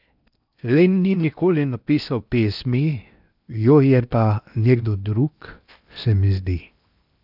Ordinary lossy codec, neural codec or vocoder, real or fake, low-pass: none; codec, 16 kHz in and 24 kHz out, 0.8 kbps, FocalCodec, streaming, 65536 codes; fake; 5.4 kHz